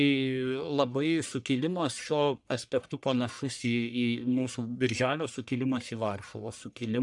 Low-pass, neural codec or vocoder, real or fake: 10.8 kHz; codec, 44.1 kHz, 1.7 kbps, Pupu-Codec; fake